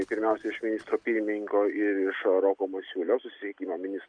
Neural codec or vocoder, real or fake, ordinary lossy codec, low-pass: none; real; Opus, 64 kbps; 9.9 kHz